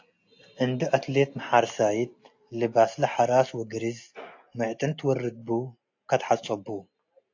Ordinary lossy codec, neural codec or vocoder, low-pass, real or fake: AAC, 48 kbps; none; 7.2 kHz; real